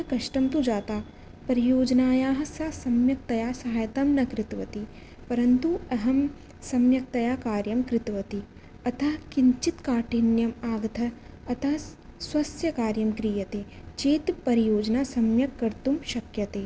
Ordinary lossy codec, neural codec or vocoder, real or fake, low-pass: none; none; real; none